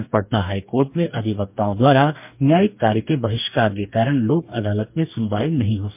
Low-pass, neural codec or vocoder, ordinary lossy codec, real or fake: 3.6 kHz; codec, 44.1 kHz, 2.6 kbps, DAC; MP3, 32 kbps; fake